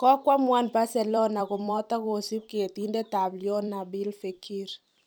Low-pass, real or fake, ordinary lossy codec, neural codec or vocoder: none; fake; none; vocoder, 44.1 kHz, 128 mel bands every 256 samples, BigVGAN v2